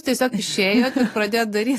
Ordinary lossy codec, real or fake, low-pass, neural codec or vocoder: AAC, 48 kbps; real; 14.4 kHz; none